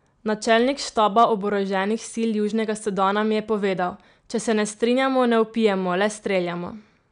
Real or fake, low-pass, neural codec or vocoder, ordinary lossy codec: real; 9.9 kHz; none; none